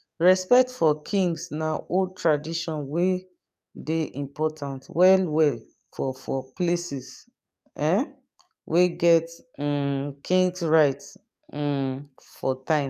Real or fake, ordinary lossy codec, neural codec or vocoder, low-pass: fake; none; codec, 44.1 kHz, 7.8 kbps, DAC; 14.4 kHz